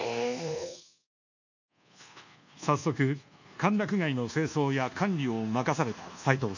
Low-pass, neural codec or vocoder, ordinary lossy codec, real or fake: 7.2 kHz; codec, 24 kHz, 1.2 kbps, DualCodec; none; fake